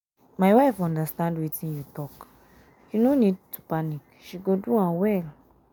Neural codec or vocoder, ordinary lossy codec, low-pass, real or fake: none; none; none; real